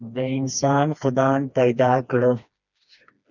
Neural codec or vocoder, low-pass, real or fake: codec, 16 kHz, 2 kbps, FreqCodec, smaller model; 7.2 kHz; fake